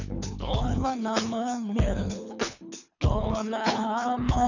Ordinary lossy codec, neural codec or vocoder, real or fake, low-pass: none; codec, 24 kHz, 3 kbps, HILCodec; fake; 7.2 kHz